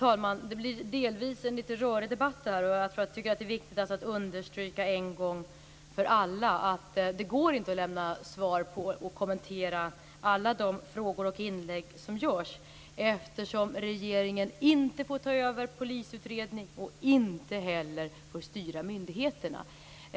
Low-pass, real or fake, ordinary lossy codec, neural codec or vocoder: none; real; none; none